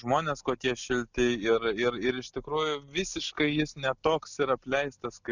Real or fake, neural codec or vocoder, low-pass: real; none; 7.2 kHz